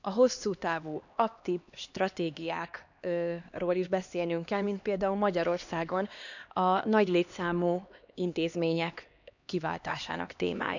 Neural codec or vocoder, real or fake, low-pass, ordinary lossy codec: codec, 16 kHz, 2 kbps, X-Codec, HuBERT features, trained on LibriSpeech; fake; 7.2 kHz; none